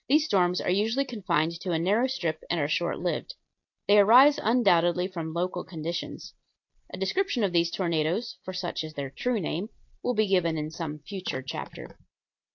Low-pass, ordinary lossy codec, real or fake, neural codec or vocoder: 7.2 kHz; AAC, 48 kbps; real; none